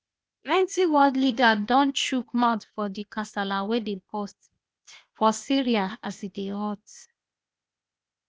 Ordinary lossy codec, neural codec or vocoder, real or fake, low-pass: none; codec, 16 kHz, 0.8 kbps, ZipCodec; fake; none